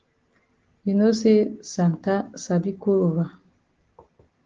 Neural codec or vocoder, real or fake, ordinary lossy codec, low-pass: none; real; Opus, 16 kbps; 7.2 kHz